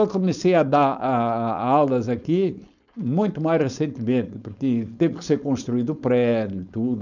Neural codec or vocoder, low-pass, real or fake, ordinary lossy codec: codec, 16 kHz, 4.8 kbps, FACodec; 7.2 kHz; fake; none